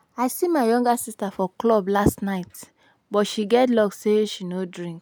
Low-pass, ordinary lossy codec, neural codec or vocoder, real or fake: none; none; none; real